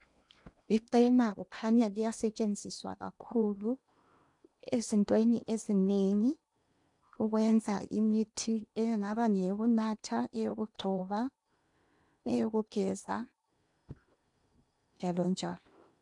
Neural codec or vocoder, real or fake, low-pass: codec, 16 kHz in and 24 kHz out, 0.8 kbps, FocalCodec, streaming, 65536 codes; fake; 10.8 kHz